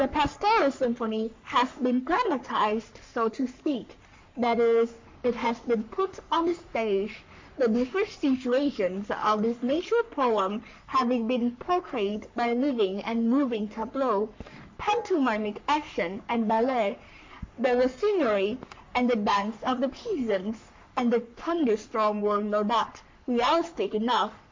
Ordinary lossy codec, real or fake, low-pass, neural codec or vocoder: MP3, 48 kbps; fake; 7.2 kHz; codec, 44.1 kHz, 3.4 kbps, Pupu-Codec